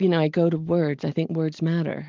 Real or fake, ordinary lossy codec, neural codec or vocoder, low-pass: real; Opus, 24 kbps; none; 7.2 kHz